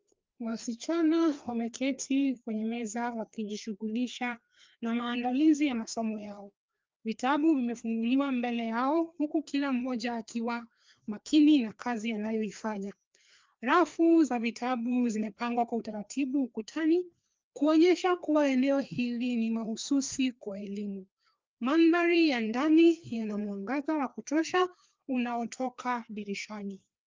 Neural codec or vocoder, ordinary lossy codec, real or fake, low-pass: codec, 16 kHz, 2 kbps, FreqCodec, larger model; Opus, 24 kbps; fake; 7.2 kHz